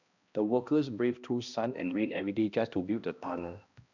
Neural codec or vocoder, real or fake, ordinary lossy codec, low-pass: codec, 16 kHz, 1 kbps, X-Codec, HuBERT features, trained on balanced general audio; fake; none; 7.2 kHz